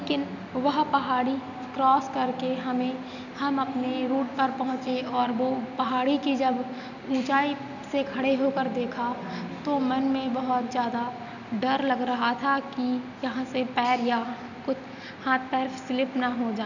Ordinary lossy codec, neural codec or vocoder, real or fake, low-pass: none; none; real; 7.2 kHz